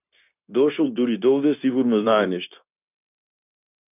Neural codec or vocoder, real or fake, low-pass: codec, 16 kHz, 0.9 kbps, LongCat-Audio-Codec; fake; 3.6 kHz